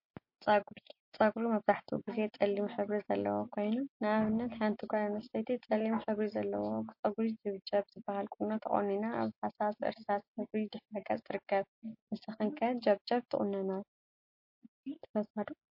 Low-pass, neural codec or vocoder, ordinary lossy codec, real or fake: 5.4 kHz; none; MP3, 32 kbps; real